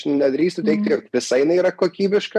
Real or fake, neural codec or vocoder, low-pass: fake; vocoder, 44.1 kHz, 128 mel bands every 512 samples, BigVGAN v2; 14.4 kHz